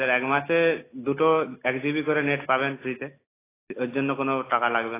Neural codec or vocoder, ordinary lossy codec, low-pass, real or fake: none; AAC, 16 kbps; 3.6 kHz; real